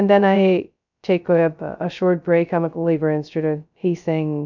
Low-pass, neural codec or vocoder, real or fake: 7.2 kHz; codec, 16 kHz, 0.2 kbps, FocalCodec; fake